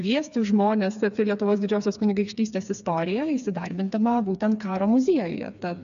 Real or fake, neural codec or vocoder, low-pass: fake; codec, 16 kHz, 4 kbps, FreqCodec, smaller model; 7.2 kHz